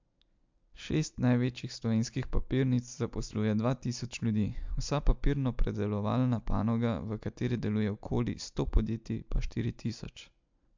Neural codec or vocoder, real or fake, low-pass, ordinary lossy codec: none; real; 7.2 kHz; MP3, 64 kbps